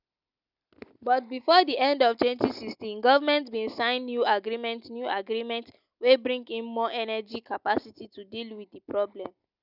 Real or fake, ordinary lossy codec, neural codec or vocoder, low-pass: real; none; none; 5.4 kHz